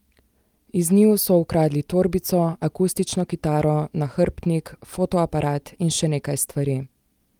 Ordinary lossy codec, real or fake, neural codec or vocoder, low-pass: Opus, 32 kbps; real; none; 19.8 kHz